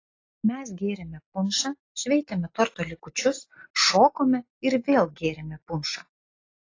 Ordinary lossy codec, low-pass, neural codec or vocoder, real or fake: AAC, 32 kbps; 7.2 kHz; none; real